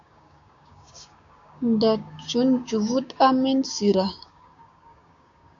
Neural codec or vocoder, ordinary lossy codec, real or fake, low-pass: codec, 16 kHz, 6 kbps, DAC; AAC, 64 kbps; fake; 7.2 kHz